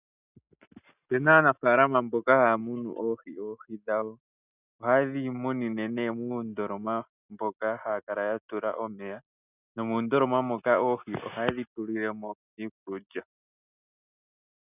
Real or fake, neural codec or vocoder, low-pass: real; none; 3.6 kHz